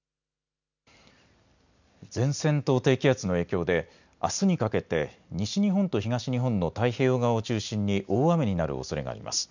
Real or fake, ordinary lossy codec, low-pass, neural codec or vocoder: real; none; 7.2 kHz; none